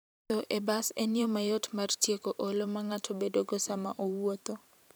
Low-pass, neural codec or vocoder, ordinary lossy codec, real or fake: none; vocoder, 44.1 kHz, 128 mel bands every 512 samples, BigVGAN v2; none; fake